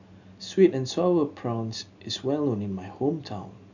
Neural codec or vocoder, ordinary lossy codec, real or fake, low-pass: none; none; real; 7.2 kHz